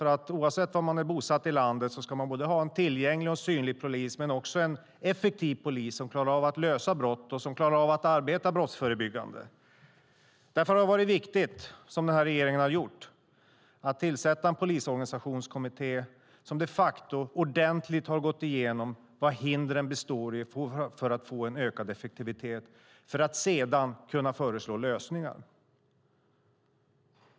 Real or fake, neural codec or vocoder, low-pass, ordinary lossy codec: real; none; none; none